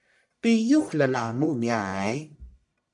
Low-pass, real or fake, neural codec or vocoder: 10.8 kHz; fake; codec, 44.1 kHz, 1.7 kbps, Pupu-Codec